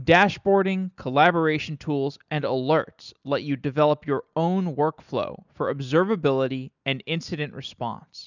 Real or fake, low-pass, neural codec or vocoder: real; 7.2 kHz; none